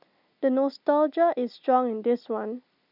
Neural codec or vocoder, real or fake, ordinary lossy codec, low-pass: none; real; none; 5.4 kHz